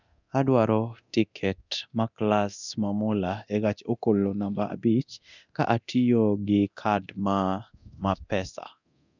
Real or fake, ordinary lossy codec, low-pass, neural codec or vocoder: fake; none; 7.2 kHz; codec, 24 kHz, 0.9 kbps, DualCodec